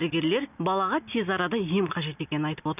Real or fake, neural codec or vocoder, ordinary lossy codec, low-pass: real; none; none; 3.6 kHz